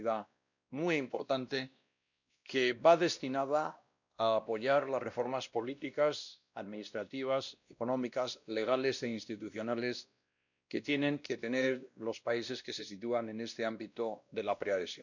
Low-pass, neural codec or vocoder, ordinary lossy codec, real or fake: 7.2 kHz; codec, 16 kHz, 1 kbps, X-Codec, WavLM features, trained on Multilingual LibriSpeech; none; fake